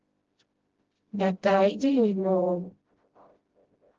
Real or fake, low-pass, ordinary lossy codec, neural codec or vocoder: fake; 7.2 kHz; Opus, 16 kbps; codec, 16 kHz, 0.5 kbps, FreqCodec, smaller model